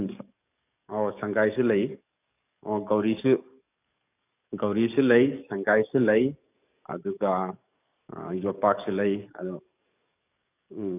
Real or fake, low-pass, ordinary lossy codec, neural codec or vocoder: fake; 3.6 kHz; none; codec, 44.1 kHz, 7.8 kbps, DAC